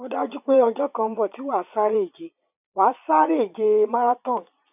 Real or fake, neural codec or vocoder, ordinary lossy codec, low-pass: fake; vocoder, 22.05 kHz, 80 mel bands, Vocos; none; 3.6 kHz